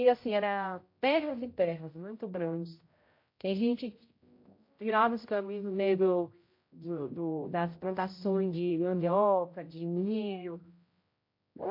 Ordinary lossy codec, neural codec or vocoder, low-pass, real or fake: MP3, 32 kbps; codec, 16 kHz, 0.5 kbps, X-Codec, HuBERT features, trained on general audio; 5.4 kHz; fake